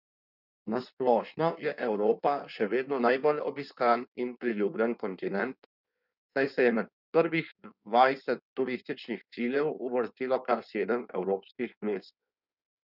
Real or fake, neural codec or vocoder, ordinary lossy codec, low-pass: fake; codec, 16 kHz in and 24 kHz out, 1.1 kbps, FireRedTTS-2 codec; none; 5.4 kHz